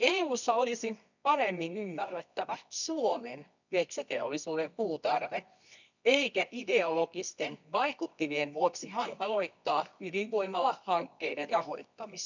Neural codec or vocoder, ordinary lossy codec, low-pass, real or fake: codec, 24 kHz, 0.9 kbps, WavTokenizer, medium music audio release; none; 7.2 kHz; fake